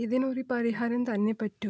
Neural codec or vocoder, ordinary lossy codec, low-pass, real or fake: none; none; none; real